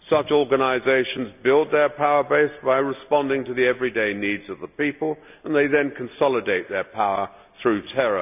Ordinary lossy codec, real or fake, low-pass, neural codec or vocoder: none; real; 3.6 kHz; none